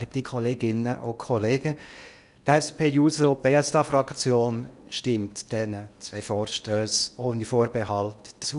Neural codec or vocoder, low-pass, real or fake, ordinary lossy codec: codec, 16 kHz in and 24 kHz out, 0.8 kbps, FocalCodec, streaming, 65536 codes; 10.8 kHz; fake; none